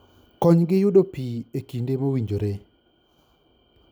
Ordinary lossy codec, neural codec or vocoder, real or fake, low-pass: none; none; real; none